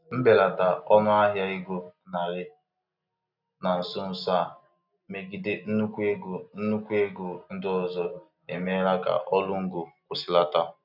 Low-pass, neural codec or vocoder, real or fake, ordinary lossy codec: 5.4 kHz; none; real; none